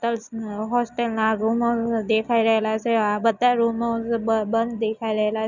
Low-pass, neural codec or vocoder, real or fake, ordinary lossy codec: 7.2 kHz; none; real; none